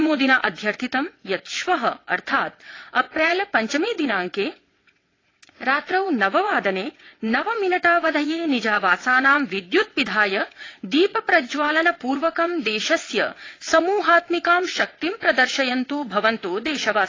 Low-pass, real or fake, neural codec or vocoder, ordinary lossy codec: 7.2 kHz; fake; vocoder, 22.05 kHz, 80 mel bands, WaveNeXt; AAC, 32 kbps